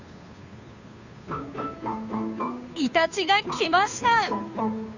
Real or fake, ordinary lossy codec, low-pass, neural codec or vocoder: fake; none; 7.2 kHz; codec, 16 kHz, 2 kbps, FunCodec, trained on Chinese and English, 25 frames a second